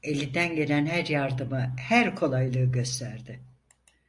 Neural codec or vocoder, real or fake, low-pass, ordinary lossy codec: none; real; 10.8 kHz; MP3, 64 kbps